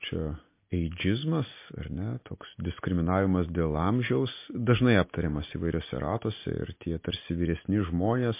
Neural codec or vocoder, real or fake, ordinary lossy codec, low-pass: none; real; MP3, 24 kbps; 3.6 kHz